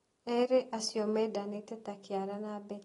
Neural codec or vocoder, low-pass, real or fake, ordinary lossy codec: none; 10.8 kHz; real; AAC, 32 kbps